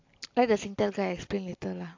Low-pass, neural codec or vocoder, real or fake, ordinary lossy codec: 7.2 kHz; none; real; AAC, 48 kbps